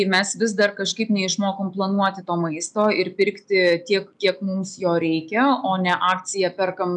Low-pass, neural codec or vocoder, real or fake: 10.8 kHz; none; real